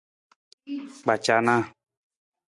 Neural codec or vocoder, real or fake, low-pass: none; real; 10.8 kHz